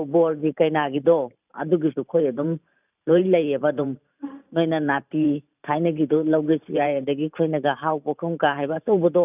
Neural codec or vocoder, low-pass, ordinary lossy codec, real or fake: vocoder, 44.1 kHz, 128 mel bands every 512 samples, BigVGAN v2; 3.6 kHz; none; fake